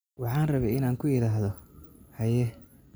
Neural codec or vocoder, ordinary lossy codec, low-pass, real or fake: none; none; none; real